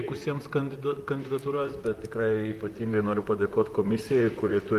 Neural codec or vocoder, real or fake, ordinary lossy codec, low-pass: vocoder, 44.1 kHz, 128 mel bands, Pupu-Vocoder; fake; Opus, 32 kbps; 14.4 kHz